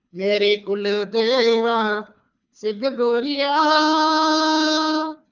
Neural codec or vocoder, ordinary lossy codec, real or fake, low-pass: codec, 24 kHz, 3 kbps, HILCodec; none; fake; 7.2 kHz